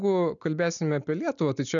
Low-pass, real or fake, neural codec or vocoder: 7.2 kHz; real; none